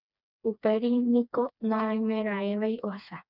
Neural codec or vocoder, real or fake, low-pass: codec, 16 kHz, 2 kbps, FreqCodec, smaller model; fake; 5.4 kHz